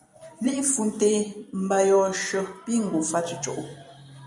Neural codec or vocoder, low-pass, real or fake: vocoder, 44.1 kHz, 128 mel bands every 256 samples, BigVGAN v2; 10.8 kHz; fake